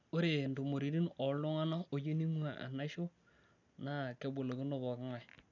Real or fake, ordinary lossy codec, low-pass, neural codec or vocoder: real; none; 7.2 kHz; none